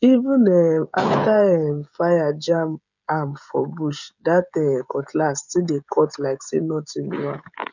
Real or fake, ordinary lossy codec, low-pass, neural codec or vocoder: fake; none; 7.2 kHz; codec, 16 kHz, 16 kbps, FreqCodec, smaller model